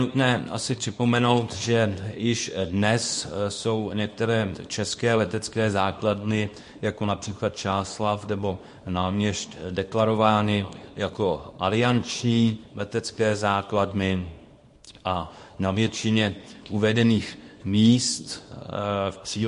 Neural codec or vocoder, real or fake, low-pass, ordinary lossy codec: codec, 24 kHz, 0.9 kbps, WavTokenizer, small release; fake; 10.8 kHz; MP3, 48 kbps